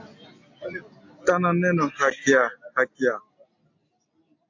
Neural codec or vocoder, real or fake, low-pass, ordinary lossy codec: none; real; 7.2 kHz; MP3, 48 kbps